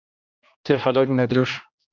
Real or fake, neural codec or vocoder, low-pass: fake; codec, 16 kHz, 1 kbps, X-Codec, HuBERT features, trained on general audio; 7.2 kHz